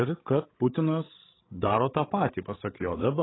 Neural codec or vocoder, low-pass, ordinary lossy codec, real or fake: codec, 16 kHz, 16 kbps, FreqCodec, larger model; 7.2 kHz; AAC, 16 kbps; fake